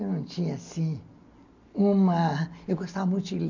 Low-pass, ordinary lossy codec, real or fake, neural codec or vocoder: 7.2 kHz; none; real; none